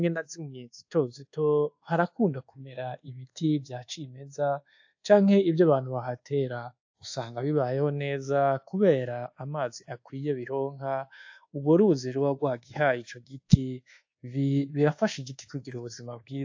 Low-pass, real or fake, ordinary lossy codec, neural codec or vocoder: 7.2 kHz; fake; AAC, 48 kbps; codec, 24 kHz, 1.2 kbps, DualCodec